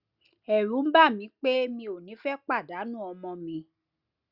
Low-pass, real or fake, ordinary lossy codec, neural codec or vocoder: 5.4 kHz; real; Opus, 64 kbps; none